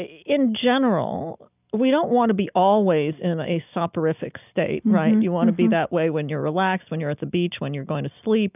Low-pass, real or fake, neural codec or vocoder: 3.6 kHz; real; none